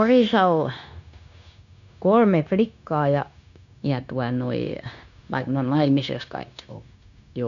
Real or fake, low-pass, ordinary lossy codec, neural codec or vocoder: fake; 7.2 kHz; none; codec, 16 kHz, 0.9 kbps, LongCat-Audio-Codec